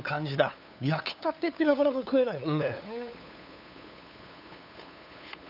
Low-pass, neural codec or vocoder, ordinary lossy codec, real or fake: 5.4 kHz; codec, 16 kHz, 8 kbps, FunCodec, trained on LibriTTS, 25 frames a second; none; fake